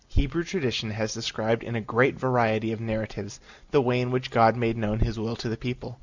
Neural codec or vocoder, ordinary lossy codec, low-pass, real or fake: none; Opus, 64 kbps; 7.2 kHz; real